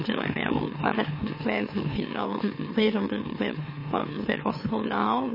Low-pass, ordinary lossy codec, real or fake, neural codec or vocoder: 5.4 kHz; MP3, 24 kbps; fake; autoencoder, 44.1 kHz, a latent of 192 numbers a frame, MeloTTS